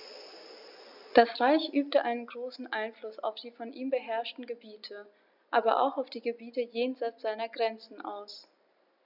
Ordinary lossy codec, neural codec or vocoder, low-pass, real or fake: none; none; 5.4 kHz; real